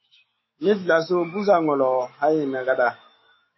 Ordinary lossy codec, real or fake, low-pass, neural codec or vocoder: MP3, 24 kbps; fake; 7.2 kHz; autoencoder, 48 kHz, 128 numbers a frame, DAC-VAE, trained on Japanese speech